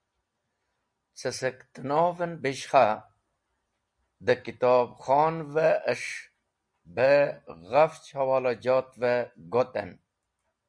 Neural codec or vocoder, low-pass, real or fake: none; 9.9 kHz; real